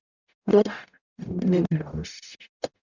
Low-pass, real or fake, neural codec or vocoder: 7.2 kHz; fake; codec, 44.1 kHz, 0.9 kbps, DAC